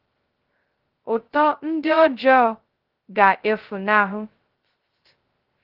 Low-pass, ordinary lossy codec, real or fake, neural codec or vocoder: 5.4 kHz; Opus, 16 kbps; fake; codec, 16 kHz, 0.2 kbps, FocalCodec